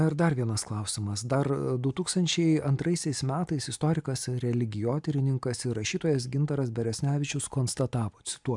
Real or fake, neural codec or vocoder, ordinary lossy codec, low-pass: real; none; MP3, 96 kbps; 10.8 kHz